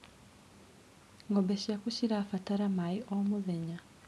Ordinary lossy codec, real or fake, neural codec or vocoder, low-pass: none; real; none; none